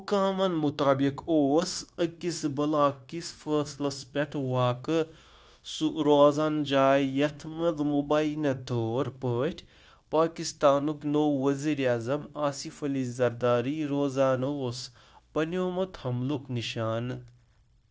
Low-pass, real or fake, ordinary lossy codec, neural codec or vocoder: none; fake; none; codec, 16 kHz, 0.9 kbps, LongCat-Audio-Codec